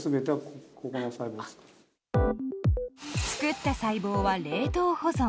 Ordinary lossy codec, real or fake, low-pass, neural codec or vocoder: none; real; none; none